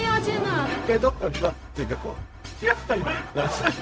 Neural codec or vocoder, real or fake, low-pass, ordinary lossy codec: codec, 16 kHz, 0.4 kbps, LongCat-Audio-Codec; fake; none; none